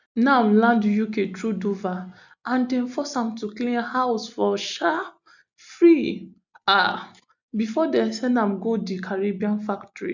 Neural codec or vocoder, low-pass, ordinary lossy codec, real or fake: none; 7.2 kHz; none; real